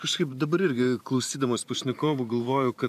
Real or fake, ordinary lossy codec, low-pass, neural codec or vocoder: fake; AAC, 96 kbps; 14.4 kHz; vocoder, 44.1 kHz, 128 mel bands every 512 samples, BigVGAN v2